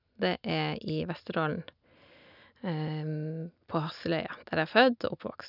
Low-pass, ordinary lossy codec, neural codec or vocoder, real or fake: 5.4 kHz; none; none; real